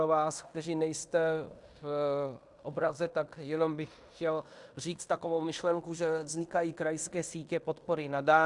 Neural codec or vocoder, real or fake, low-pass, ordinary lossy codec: codec, 16 kHz in and 24 kHz out, 0.9 kbps, LongCat-Audio-Codec, fine tuned four codebook decoder; fake; 10.8 kHz; Opus, 64 kbps